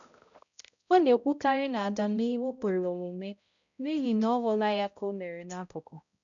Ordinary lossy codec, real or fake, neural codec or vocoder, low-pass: none; fake; codec, 16 kHz, 0.5 kbps, X-Codec, HuBERT features, trained on balanced general audio; 7.2 kHz